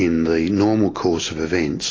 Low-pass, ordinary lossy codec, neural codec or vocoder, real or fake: 7.2 kHz; AAC, 32 kbps; none; real